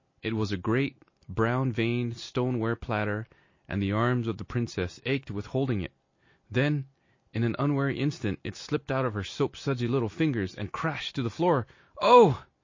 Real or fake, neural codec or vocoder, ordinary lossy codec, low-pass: real; none; MP3, 32 kbps; 7.2 kHz